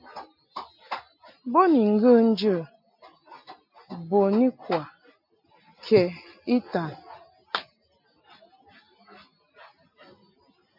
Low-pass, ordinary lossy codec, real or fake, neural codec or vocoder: 5.4 kHz; MP3, 48 kbps; real; none